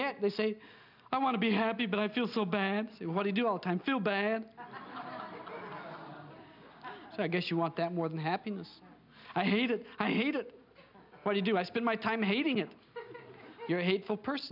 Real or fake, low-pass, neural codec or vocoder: real; 5.4 kHz; none